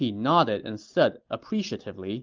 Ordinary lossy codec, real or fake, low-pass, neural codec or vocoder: Opus, 32 kbps; real; 7.2 kHz; none